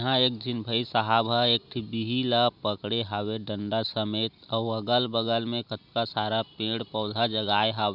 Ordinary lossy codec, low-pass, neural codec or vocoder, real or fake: none; 5.4 kHz; none; real